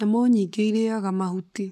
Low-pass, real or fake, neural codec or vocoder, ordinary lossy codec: 14.4 kHz; fake; codec, 44.1 kHz, 7.8 kbps, Pupu-Codec; none